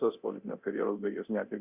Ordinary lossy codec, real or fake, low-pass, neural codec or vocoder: Opus, 64 kbps; fake; 3.6 kHz; codec, 24 kHz, 0.9 kbps, DualCodec